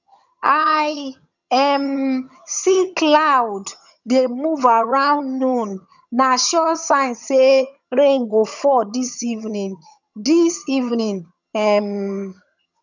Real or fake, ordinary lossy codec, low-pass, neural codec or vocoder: fake; none; 7.2 kHz; vocoder, 22.05 kHz, 80 mel bands, HiFi-GAN